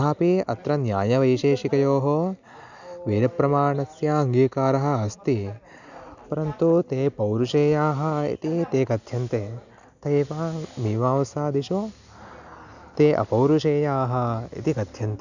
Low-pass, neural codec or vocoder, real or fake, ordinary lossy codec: 7.2 kHz; none; real; none